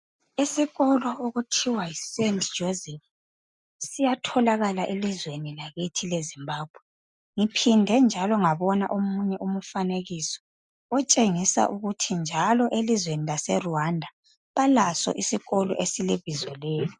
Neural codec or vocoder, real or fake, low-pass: none; real; 10.8 kHz